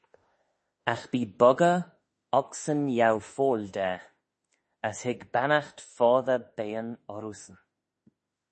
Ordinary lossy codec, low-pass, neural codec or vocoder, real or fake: MP3, 32 kbps; 10.8 kHz; autoencoder, 48 kHz, 32 numbers a frame, DAC-VAE, trained on Japanese speech; fake